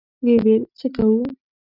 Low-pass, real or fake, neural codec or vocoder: 5.4 kHz; real; none